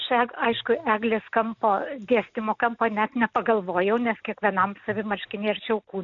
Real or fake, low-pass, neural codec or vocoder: real; 7.2 kHz; none